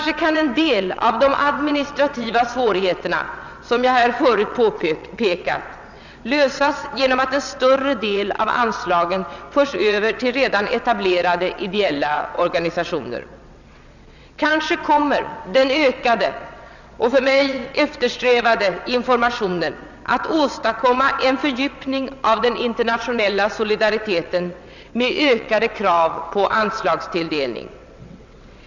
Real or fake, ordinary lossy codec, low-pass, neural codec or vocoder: fake; none; 7.2 kHz; vocoder, 22.05 kHz, 80 mel bands, WaveNeXt